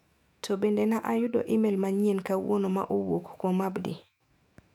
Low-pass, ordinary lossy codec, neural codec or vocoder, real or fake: 19.8 kHz; none; autoencoder, 48 kHz, 128 numbers a frame, DAC-VAE, trained on Japanese speech; fake